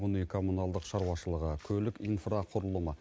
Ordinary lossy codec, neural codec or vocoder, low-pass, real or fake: none; none; none; real